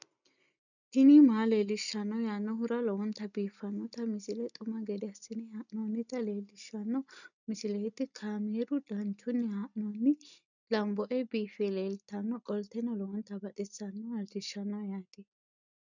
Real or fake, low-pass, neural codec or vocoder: real; 7.2 kHz; none